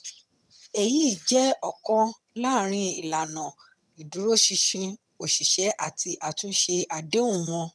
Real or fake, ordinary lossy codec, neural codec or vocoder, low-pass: fake; none; vocoder, 22.05 kHz, 80 mel bands, HiFi-GAN; none